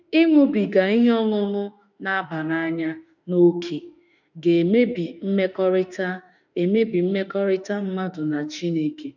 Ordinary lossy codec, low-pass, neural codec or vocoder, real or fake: none; 7.2 kHz; autoencoder, 48 kHz, 32 numbers a frame, DAC-VAE, trained on Japanese speech; fake